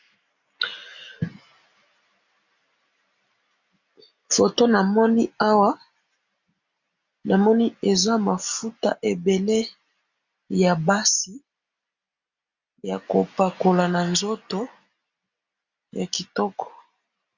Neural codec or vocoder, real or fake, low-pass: none; real; 7.2 kHz